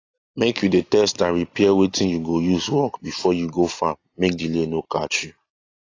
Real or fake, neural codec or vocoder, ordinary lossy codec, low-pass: real; none; AAC, 32 kbps; 7.2 kHz